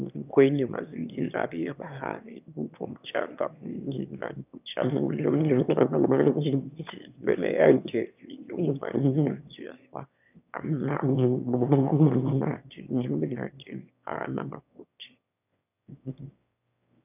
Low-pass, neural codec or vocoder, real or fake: 3.6 kHz; autoencoder, 22.05 kHz, a latent of 192 numbers a frame, VITS, trained on one speaker; fake